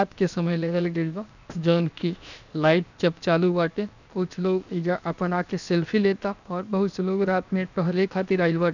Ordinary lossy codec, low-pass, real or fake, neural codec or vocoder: none; 7.2 kHz; fake; codec, 16 kHz, 0.7 kbps, FocalCodec